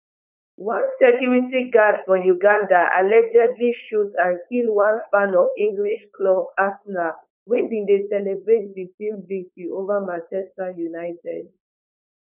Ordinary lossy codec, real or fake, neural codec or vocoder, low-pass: none; fake; codec, 16 kHz, 4.8 kbps, FACodec; 3.6 kHz